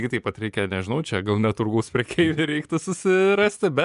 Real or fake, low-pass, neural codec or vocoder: fake; 10.8 kHz; vocoder, 24 kHz, 100 mel bands, Vocos